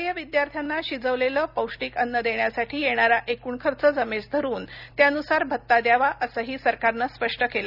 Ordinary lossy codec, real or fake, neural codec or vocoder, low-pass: none; real; none; 5.4 kHz